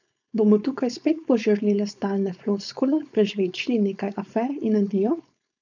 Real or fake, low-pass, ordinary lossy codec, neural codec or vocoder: fake; 7.2 kHz; none; codec, 16 kHz, 4.8 kbps, FACodec